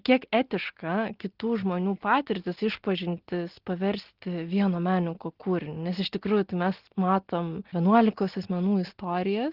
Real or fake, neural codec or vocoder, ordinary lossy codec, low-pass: real; none; Opus, 16 kbps; 5.4 kHz